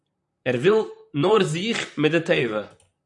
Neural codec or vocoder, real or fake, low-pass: vocoder, 44.1 kHz, 128 mel bands, Pupu-Vocoder; fake; 10.8 kHz